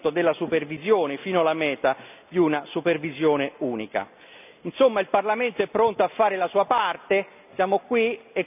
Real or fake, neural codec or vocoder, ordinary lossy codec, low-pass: real; none; none; 3.6 kHz